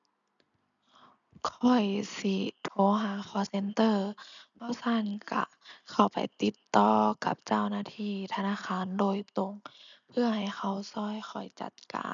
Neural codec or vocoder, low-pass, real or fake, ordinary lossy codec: none; 7.2 kHz; real; MP3, 96 kbps